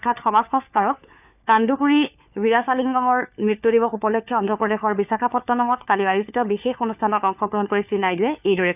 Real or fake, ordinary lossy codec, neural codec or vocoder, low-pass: fake; none; codec, 16 kHz, 4 kbps, FunCodec, trained on LibriTTS, 50 frames a second; 3.6 kHz